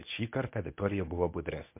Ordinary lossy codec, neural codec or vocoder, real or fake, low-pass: MP3, 32 kbps; codec, 24 kHz, 0.9 kbps, WavTokenizer, medium speech release version 1; fake; 3.6 kHz